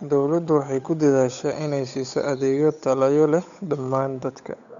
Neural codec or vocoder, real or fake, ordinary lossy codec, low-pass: codec, 16 kHz, 8 kbps, FunCodec, trained on Chinese and English, 25 frames a second; fake; none; 7.2 kHz